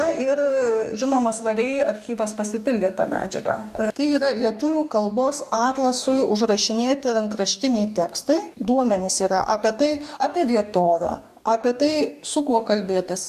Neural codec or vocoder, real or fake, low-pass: codec, 44.1 kHz, 2.6 kbps, DAC; fake; 14.4 kHz